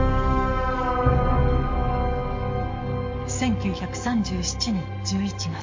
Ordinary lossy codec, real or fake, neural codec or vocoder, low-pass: MP3, 48 kbps; fake; codec, 44.1 kHz, 7.8 kbps, DAC; 7.2 kHz